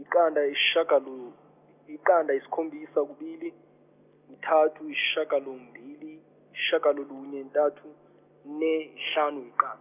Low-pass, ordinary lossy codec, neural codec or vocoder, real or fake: 3.6 kHz; none; none; real